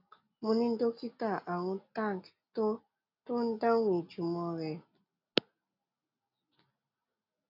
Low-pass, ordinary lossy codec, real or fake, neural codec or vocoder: 5.4 kHz; none; real; none